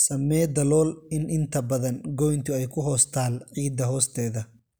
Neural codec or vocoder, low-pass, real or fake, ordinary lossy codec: none; none; real; none